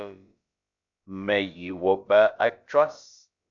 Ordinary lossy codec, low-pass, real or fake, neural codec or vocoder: MP3, 64 kbps; 7.2 kHz; fake; codec, 16 kHz, about 1 kbps, DyCAST, with the encoder's durations